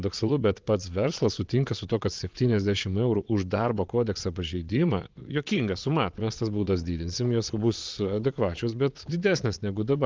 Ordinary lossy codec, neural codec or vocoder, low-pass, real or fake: Opus, 24 kbps; vocoder, 22.05 kHz, 80 mel bands, Vocos; 7.2 kHz; fake